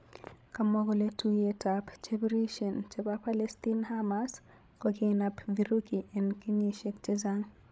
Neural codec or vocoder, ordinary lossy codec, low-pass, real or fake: codec, 16 kHz, 16 kbps, FreqCodec, larger model; none; none; fake